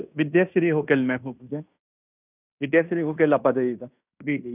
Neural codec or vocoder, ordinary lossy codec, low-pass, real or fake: codec, 16 kHz in and 24 kHz out, 0.9 kbps, LongCat-Audio-Codec, fine tuned four codebook decoder; none; 3.6 kHz; fake